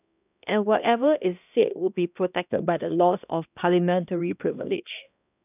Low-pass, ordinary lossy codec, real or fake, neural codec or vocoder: 3.6 kHz; none; fake; codec, 16 kHz, 1 kbps, X-Codec, HuBERT features, trained on balanced general audio